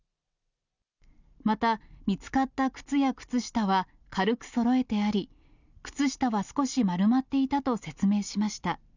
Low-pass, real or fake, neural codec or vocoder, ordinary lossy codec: 7.2 kHz; real; none; none